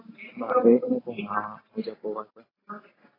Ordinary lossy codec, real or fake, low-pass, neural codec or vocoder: AAC, 24 kbps; real; 5.4 kHz; none